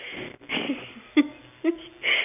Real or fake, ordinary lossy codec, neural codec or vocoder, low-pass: real; none; none; 3.6 kHz